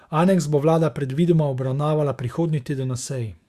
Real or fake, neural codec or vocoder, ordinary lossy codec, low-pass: fake; autoencoder, 48 kHz, 128 numbers a frame, DAC-VAE, trained on Japanese speech; Opus, 64 kbps; 14.4 kHz